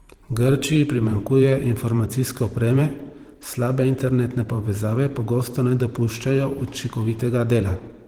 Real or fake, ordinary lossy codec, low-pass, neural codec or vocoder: fake; Opus, 16 kbps; 19.8 kHz; vocoder, 44.1 kHz, 128 mel bands every 512 samples, BigVGAN v2